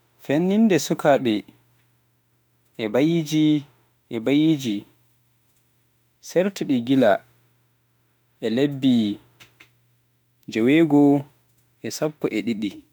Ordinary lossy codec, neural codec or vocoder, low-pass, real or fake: none; autoencoder, 48 kHz, 32 numbers a frame, DAC-VAE, trained on Japanese speech; none; fake